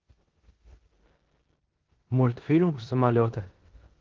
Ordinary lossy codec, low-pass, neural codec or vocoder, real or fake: Opus, 16 kbps; 7.2 kHz; codec, 16 kHz in and 24 kHz out, 0.9 kbps, LongCat-Audio-Codec, four codebook decoder; fake